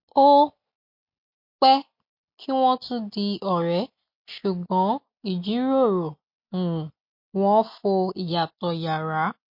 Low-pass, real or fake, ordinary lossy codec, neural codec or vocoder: 5.4 kHz; real; MP3, 32 kbps; none